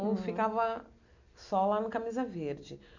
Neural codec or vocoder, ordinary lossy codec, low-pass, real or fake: none; none; 7.2 kHz; real